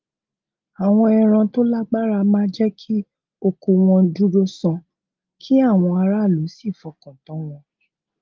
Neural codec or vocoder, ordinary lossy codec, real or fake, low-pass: none; Opus, 32 kbps; real; 7.2 kHz